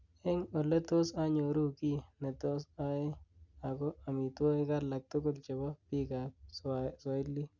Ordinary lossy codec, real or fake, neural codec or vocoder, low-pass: Opus, 64 kbps; real; none; 7.2 kHz